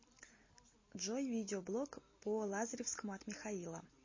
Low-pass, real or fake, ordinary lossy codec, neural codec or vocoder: 7.2 kHz; real; MP3, 32 kbps; none